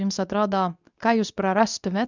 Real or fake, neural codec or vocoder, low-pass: fake; codec, 24 kHz, 0.9 kbps, WavTokenizer, medium speech release version 2; 7.2 kHz